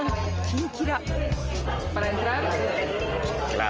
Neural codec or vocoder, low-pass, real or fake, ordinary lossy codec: none; 7.2 kHz; real; Opus, 24 kbps